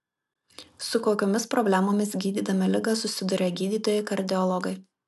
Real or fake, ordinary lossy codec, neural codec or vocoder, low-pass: real; MP3, 96 kbps; none; 14.4 kHz